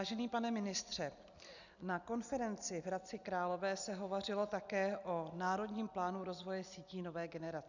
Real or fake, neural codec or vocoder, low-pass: real; none; 7.2 kHz